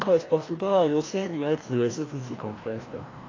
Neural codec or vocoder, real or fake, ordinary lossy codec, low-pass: codec, 16 kHz, 1 kbps, FreqCodec, larger model; fake; AAC, 32 kbps; 7.2 kHz